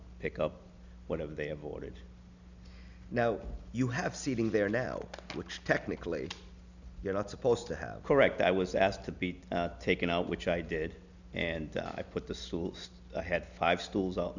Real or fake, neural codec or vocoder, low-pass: real; none; 7.2 kHz